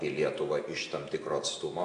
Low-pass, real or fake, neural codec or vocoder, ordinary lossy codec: 9.9 kHz; real; none; AAC, 48 kbps